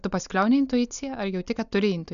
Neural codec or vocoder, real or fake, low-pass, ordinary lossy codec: none; real; 7.2 kHz; MP3, 96 kbps